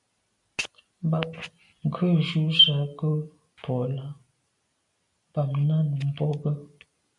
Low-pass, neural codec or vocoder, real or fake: 10.8 kHz; none; real